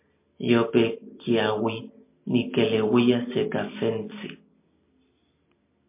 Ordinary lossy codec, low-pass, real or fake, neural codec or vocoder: MP3, 24 kbps; 3.6 kHz; real; none